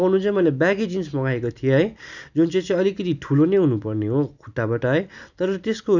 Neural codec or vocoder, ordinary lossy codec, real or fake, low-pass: none; none; real; 7.2 kHz